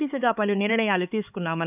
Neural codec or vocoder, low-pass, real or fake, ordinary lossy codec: codec, 16 kHz, 4 kbps, X-Codec, HuBERT features, trained on LibriSpeech; 3.6 kHz; fake; none